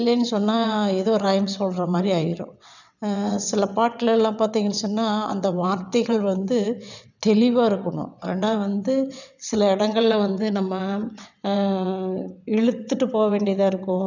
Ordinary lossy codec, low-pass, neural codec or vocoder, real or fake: none; 7.2 kHz; vocoder, 22.05 kHz, 80 mel bands, WaveNeXt; fake